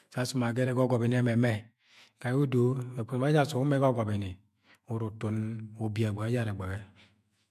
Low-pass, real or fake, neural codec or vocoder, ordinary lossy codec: 14.4 kHz; fake; autoencoder, 48 kHz, 128 numbers a frame, DAC-VAE, trained on Japanese speech; MP3, 64 kbps